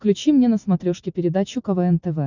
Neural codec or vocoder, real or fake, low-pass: none; real; 7.2 kHz